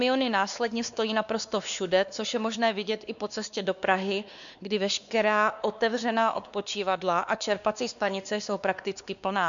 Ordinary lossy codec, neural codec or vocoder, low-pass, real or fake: MP3, 96 kbps; codec, 16 kHz, 2 kbps, X-Codec, WavLM features, trained on Multilingual LibriSpeech; 7.2 kHz; fake